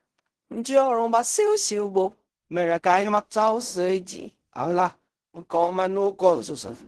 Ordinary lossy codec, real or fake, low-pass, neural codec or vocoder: Opus, 16 kbps; fake; 10.8 kHz; codec, 16 kHz in and 24 kHz out, 0.4 kbps, LongCat-Audio-Codec, fine tuned four codebook decoder